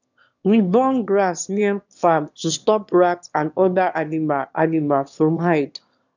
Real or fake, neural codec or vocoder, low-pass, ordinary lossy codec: fake; autoencoder, 22.05 kHz, a latent of 192 numbers a frame, VITS, trained on one speaker; 7.2 kHz; none